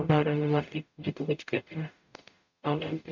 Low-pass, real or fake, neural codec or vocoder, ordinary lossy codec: 7.2 kHz; fake; codec, 44.1 kHz, 0.9 kbps, DAC; none